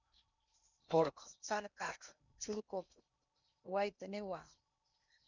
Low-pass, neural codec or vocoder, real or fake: 7.2 kHz; codec, 16 kHz in and 24 kHz out, 0.8 kbps, FocalCodec, streaming, 65536 codes; fake